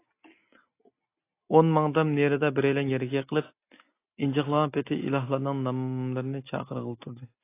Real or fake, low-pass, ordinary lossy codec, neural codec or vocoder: real; 3.6 kHz; AAC, 24 kbps; none